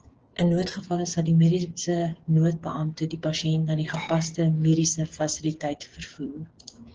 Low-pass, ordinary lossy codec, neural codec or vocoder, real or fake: 7.2 kHz; Opus, 16 kbps; codec, 16 kHz, 8 kbps, FreqCodec, smaller model; fake